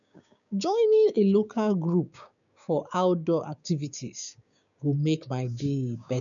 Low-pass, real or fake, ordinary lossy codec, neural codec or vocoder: 7.2 kHz; fake; none; codec, 16 kHz, 6 kbps, DAC